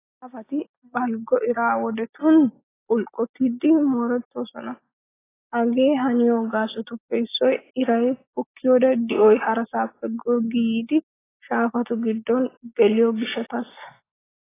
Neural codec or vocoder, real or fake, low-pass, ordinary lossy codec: none; real; 3.6 kHz; AAC, 16 kbps